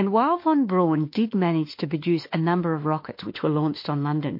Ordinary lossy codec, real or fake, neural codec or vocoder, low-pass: MP3, 32 kbps; fake; autoencoder, 48 kHz, 32 numbers a frame, DAC-VAE, trained on Japanese speech; 5.4 kHz